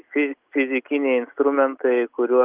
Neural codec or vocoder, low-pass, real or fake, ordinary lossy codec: none; 3.6 kHz; real; Opus, 32 kbps